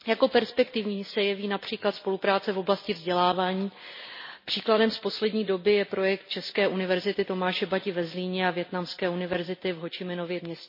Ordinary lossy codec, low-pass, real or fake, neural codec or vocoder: MP3, 24 kbps; 5.4 kHz; real; none